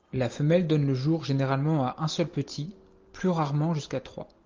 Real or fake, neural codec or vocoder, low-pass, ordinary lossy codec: real; none; 7.2 kHz; Opus, 32 kbps